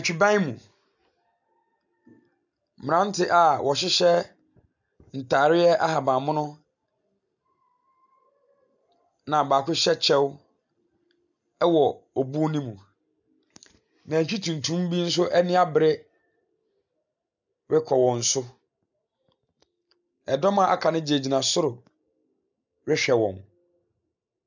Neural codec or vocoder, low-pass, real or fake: none; 7.2 kHz; real